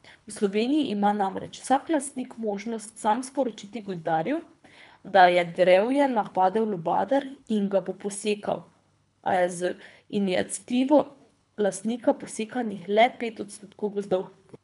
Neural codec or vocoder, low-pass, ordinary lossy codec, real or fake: codec, 24 kHz, 3 kbps, HILCodec; 10.8 kHz; none; fake